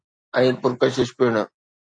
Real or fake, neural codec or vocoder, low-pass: real; none; 9.9 kHz